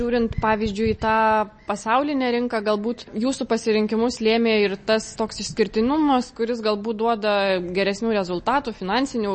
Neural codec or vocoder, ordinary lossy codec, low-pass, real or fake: none; MP3, 32 kbps; 10.8 kHz; real